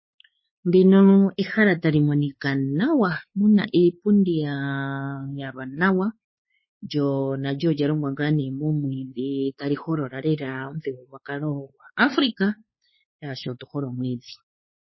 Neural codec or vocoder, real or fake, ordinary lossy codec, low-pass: codec, 16 kHz, 4 kbps, X-Codec, WavLM features, trained on Multilingual LibriSpeech; fake; MP3, 24 kbps; 7.2 kHz